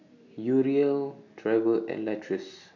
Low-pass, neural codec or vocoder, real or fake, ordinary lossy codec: 7.2 kHz; none; real; none